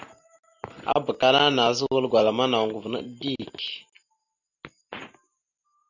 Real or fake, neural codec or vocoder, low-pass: real; none; 7.2 kHz